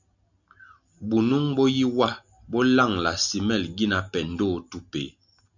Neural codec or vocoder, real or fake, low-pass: none; real; 7.2 kHz